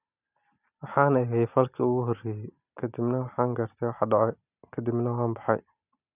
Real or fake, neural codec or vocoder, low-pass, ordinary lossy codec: real; none; 3.6 kHz; none